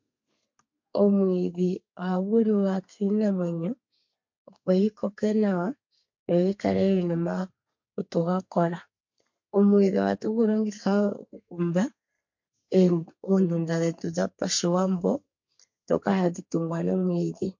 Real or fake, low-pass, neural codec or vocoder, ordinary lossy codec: fake; 7.2 kHz; codec, 32 kHz, 1.9 kbps, SNAC; MP3, 48 kbps